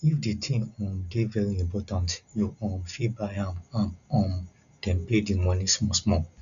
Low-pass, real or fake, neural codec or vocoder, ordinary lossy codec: 7.2 kHz; real; none; none